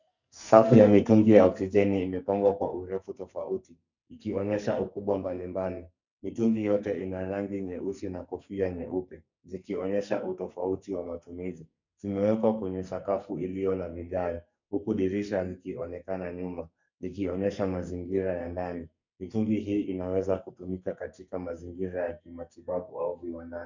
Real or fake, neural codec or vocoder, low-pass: fake; codec, 44.1 kHz, 2.6 kbps, SNAC; 7.2 kHz